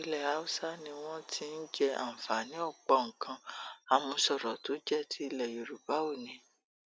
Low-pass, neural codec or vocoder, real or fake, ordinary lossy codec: none; none; real; none